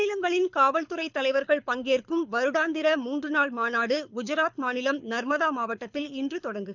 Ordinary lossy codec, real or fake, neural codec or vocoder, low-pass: none; fake; codec, 24 kHz, 6 kbps, HILCodec; 7.2 kHz